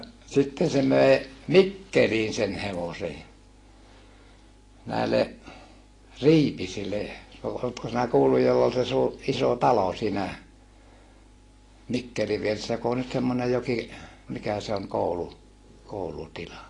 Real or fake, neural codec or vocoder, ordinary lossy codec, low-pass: real; none; AAC, 32 kbps; 10.8 kHz